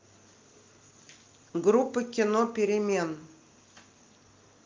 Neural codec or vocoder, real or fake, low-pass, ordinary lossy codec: none; real; 7.2 kHz; Opus, 32 kbps